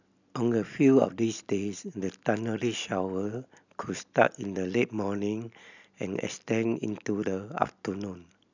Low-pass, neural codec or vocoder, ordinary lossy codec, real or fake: 7.2 kHz; none; none; real